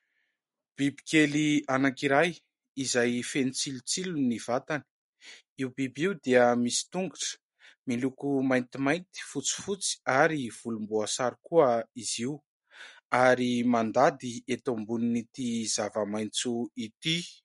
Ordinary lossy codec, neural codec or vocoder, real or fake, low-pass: MP3, 48 kbps; none; real; 19.8 kHz